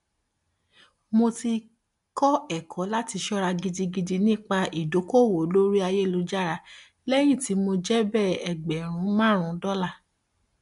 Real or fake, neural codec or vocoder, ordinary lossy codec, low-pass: real; none; MP3, 96 kbps; 10.8 kHz